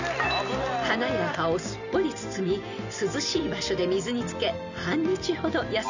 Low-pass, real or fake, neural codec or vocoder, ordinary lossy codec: 7.2 kHz; real; none; none